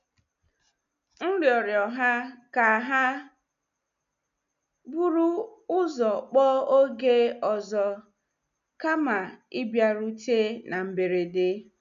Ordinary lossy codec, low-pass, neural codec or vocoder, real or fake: none; 7.2 kHz; none; real